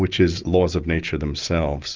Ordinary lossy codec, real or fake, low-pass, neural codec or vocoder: Opus, 24 kbps; real; 7.2 kHz; none